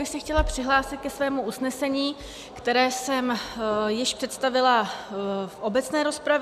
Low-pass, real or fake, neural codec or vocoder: 14.4 kHz; real; none